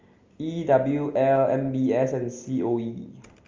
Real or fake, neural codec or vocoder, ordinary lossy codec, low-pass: real; none; Opus, 32 kbps; 7.2 kHz